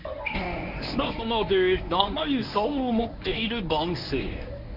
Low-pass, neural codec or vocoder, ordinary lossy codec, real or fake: 5.4 kHz; codec, 24 kHz, 0.9 kbps, WavTokenizer, medium speech release version 1; none; fake